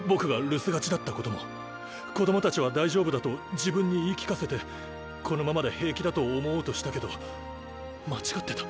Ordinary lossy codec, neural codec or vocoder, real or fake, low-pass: none; none; real; none